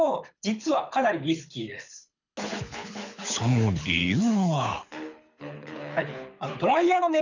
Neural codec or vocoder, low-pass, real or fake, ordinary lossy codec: codec, 24 kHz, 6 kbps, HILCodec; 7.2 kHz; fake; none